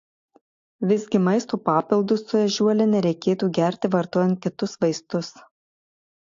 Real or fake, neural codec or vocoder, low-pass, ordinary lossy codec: real; none; 7.2 kHz; AAC, 48 kbps